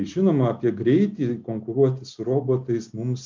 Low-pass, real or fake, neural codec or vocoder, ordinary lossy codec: 7.2 kHz; real; none; MP3, 64 kbps